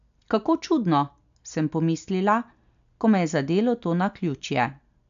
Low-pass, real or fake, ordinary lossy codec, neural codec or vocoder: 7.2 kHz; real; none; none